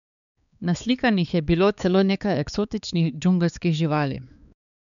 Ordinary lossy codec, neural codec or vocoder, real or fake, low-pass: none; codec, 16 kHz, 4 kbps, X-Codec, HuBERT features, trained on balanced general audio; fake; 7.2 kHz